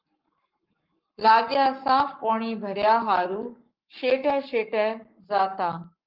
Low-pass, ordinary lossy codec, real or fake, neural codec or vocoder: 5.4 kHz; Opus, 32 kbps; fake; codec, 44.1 kHz, 7.8 kbps, Pupu-Codec